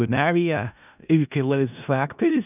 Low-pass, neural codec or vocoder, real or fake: 3.6 kHz; codec, 16 kHz in and 24 kHz out, 0.4 kbps, LongCat-Audio-Codec, four codebook decoder; fake